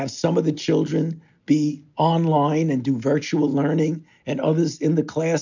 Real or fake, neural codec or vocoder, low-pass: real; none; 7.2 kHz